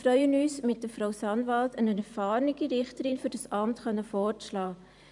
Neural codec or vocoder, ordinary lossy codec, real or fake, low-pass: vocoder, 44.1 kHz, 128 mel bands every 512 samples, BigVGAN v2; none; fake; 10.8 kHz